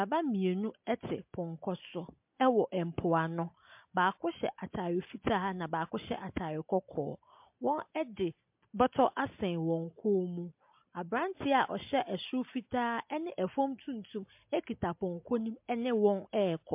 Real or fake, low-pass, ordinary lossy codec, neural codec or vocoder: real; 3.6 kHz; MP3, 32 kbps; none